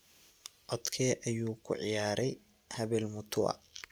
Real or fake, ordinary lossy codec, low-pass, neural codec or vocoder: real; none; none; none